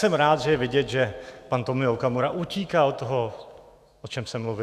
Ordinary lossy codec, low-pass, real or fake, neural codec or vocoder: Opus, 64 kbps; 14.4 kHz; fake; autoencoder, 48 kHz, 128 numbers a frame, DAC-VAE, trained on Japanese speech